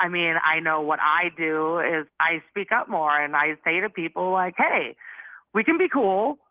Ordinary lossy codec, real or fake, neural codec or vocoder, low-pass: Opus, 16 kbps; real; none; 3.6 kHz